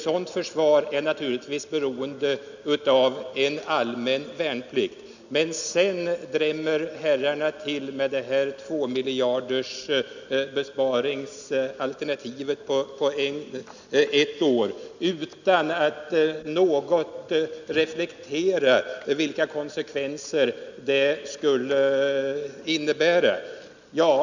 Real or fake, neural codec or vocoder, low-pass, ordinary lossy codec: real; none; 7.2 kHz; none